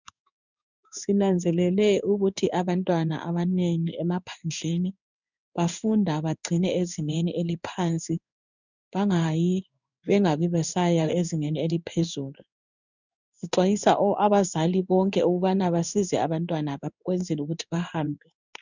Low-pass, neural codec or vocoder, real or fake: 7.2 kHz; codec, 16 kHz in and 24 kHz out, 1 kbps, XY-Tokenizer; fake